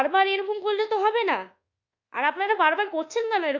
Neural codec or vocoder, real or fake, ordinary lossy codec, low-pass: codec, 24 kHz, 1.2 kbps, DualCodec; fake; Opus, 64 kbps; 7.2 kHz